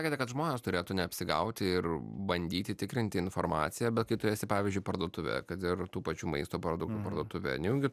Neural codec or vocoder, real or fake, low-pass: none; real; 14.4 kHz